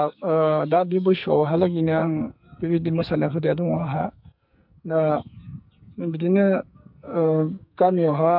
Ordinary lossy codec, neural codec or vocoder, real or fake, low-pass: MP3, 48 kbps; codec, 44.1 kHz, 2.6 kbps, SNAC; fake; 5.4 kHz